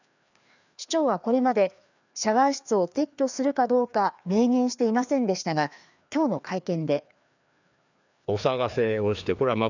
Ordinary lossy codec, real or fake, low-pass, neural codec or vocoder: none; fake; 7.2 kHz; codec, 16 kHz, 2 kbps, FreqCodec, larger model